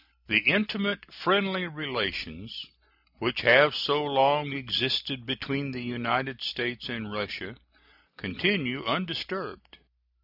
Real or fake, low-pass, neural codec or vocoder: real; 5.4 kHz; none